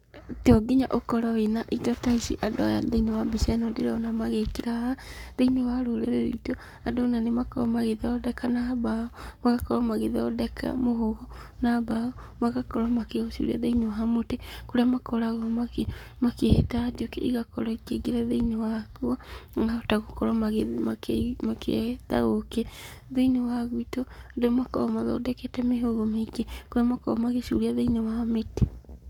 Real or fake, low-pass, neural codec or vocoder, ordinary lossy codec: fake; 19.8 kHz; codec, 44.1 kHz, 7.8 kbps, Pupu-Codec; none